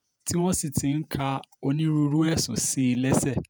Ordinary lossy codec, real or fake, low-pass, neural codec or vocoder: none; fake; none; vocoder, 48 kHz, 128 mel bands, Vocos